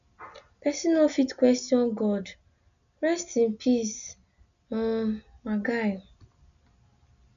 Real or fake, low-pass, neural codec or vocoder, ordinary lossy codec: real; 7.2 kHz; none; none